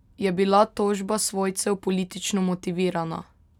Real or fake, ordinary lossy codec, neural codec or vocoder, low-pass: real; none; none; 19.8 kHz